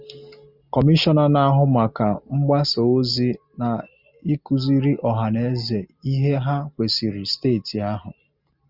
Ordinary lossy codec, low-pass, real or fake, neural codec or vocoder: Opus, 64 kbps; 5.4 kHz; real; none